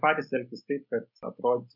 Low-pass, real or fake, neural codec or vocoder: 5.4 kHz; real; none